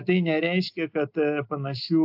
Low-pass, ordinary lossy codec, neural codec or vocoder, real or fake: 5.4 kHz; AAC, 48 kbps; none; real